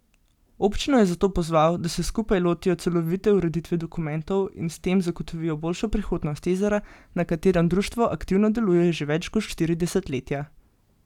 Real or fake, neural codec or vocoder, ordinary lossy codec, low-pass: real; none; none; 19.8 kHz